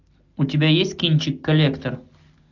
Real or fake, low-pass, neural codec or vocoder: real; 7.2 kHz; none